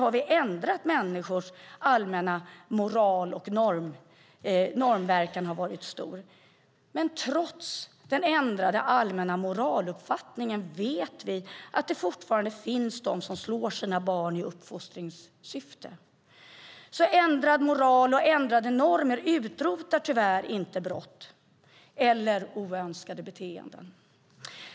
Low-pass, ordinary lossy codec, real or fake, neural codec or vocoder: none; none; real; none